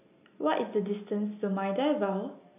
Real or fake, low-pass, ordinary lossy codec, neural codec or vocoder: real; 3.6 kHz; AAC, 32 kbps; none